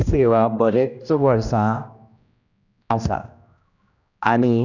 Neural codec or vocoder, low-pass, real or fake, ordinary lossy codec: codec, 16 kHz, 1 kbps, X-Codec, HuBERT features, trained on general audio; 7.2 kHz; fake; none